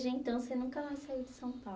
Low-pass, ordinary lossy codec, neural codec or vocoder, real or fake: none; none; none; real